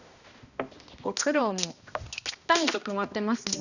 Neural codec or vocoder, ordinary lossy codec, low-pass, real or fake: codec, 16 kHz, 1 kbps, X-Codec, HuBERT features, trained on balanced general audio; none; 7.2 kHz; fake